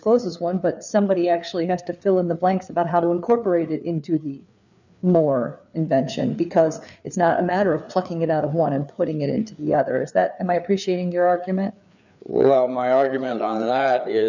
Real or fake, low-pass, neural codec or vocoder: fake; 7.2 kHz; codec, 16 kHz, 4 kbps, FreqCodec, larger model